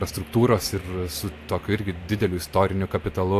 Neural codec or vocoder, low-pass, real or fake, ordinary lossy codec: none; 14.4 kHz; real; AAC, 64 kbps